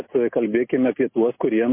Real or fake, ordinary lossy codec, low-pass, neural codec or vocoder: real; MP3, 24 kbps; 3.6 kHz; none